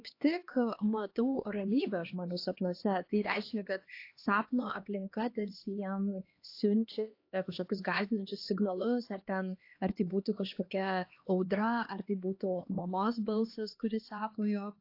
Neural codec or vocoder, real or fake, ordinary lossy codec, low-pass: codec, 16 kHz, 2 kbps, X-Codec, HuBERT features, trained on LibriSpeech; fake; AAC, 32 kbps; 5.4 kHz